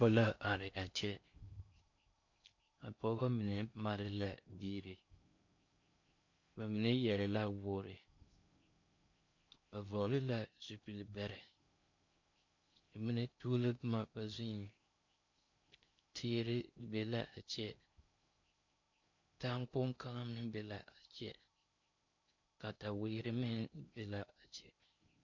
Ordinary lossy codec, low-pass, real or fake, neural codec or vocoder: MP3, 48 kbps; 7.2 kHz; fake; codec, 16 kHz in and 24 kHz out, 0.6 kbps, FocalCodec, streaming, 2048 codes